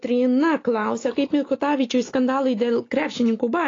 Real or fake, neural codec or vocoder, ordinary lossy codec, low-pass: real; none; AAC, 32 kbps; 7.2 kHz